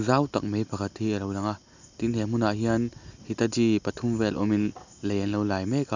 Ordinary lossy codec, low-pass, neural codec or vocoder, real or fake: none; 7.2 kHz; none; real